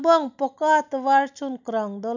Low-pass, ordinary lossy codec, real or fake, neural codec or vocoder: 7.2 kHz; none; real; none